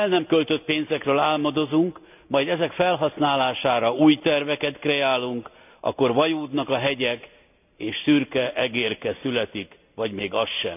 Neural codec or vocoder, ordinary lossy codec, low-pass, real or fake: none; none; 3.6 kHz; real